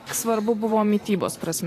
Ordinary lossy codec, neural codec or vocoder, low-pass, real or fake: AAC, 48 kbps; vocoder, 44.1 kHz, 128 mel bands every 512 samples, BigVGAN v2; 14.4 kHz; fake